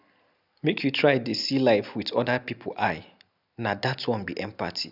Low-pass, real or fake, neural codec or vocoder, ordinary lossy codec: 5.4 kHz; real; none; none